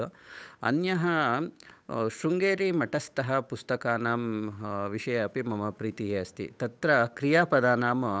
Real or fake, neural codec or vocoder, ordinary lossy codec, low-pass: fake; codec, 16 kHz, 8 kbps, FunCodec, trained on Chinese and English, 25 frames a second; none; none